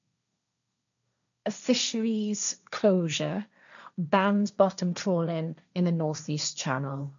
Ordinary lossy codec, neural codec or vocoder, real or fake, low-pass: none; codec, 16 kHz, 1.1 kbps, Voila-Tokenizer; fake; 7.2 kHz